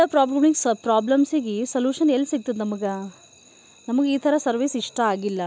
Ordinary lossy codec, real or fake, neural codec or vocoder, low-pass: none; real; none; none